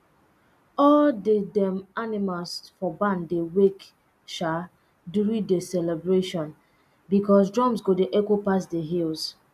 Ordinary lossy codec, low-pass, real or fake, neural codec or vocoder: none; 14.4 kHz; real; none